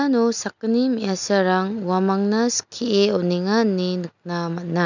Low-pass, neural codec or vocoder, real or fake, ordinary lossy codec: 7.2 kHz; none; real; none